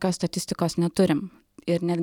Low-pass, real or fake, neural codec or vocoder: 19.8 kHz; real; none